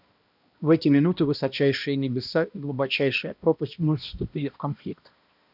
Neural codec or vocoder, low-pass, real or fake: codec, 16 kHz, 1 kbps, X-Codec, HuBERT features, trained on balanced general audio; 5.4 kHz; fake